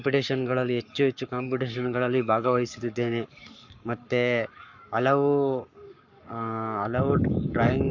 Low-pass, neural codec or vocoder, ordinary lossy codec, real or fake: 7.2 kHz; codec, 44.1 kHz, 7.8 kbps, Pupu-Codec; none; fake